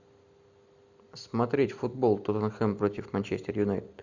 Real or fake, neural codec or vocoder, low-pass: real; none; 7.2 kHz